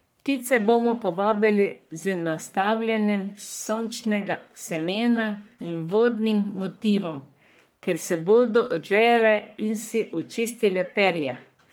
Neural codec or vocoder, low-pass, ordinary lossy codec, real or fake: codec, 44.1 kHz, 1.7 kbps, Pupu-Codec; none; none; fake